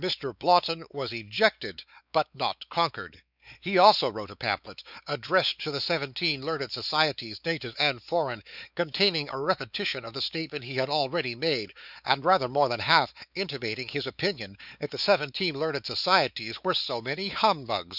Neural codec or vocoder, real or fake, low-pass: codec, 16 kHz, 4 kbps, X-Codec, WavLM features, trained on Multilingual LibriSpeech; fake; 5.4 kHz